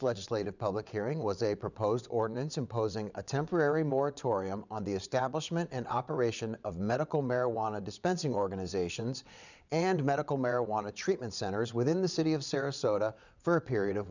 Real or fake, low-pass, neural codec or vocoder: fake; 7.2 kHz; vocoder, 22.05 kHz, 80 mel bands, WaveNeXt